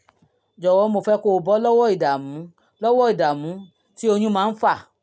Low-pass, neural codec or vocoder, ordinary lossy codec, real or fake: none; none; none; real